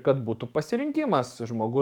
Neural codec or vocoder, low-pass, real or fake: codec, 44.1 kHz, 7.8 kbps, DAC; 19.8 kHz; fake